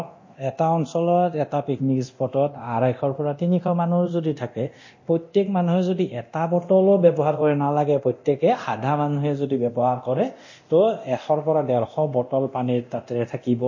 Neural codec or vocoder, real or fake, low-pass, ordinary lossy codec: codec, 24 kHz, 0.9 kbps, DualCodec; fake; 7.2 kHz; MP3, 32 kbps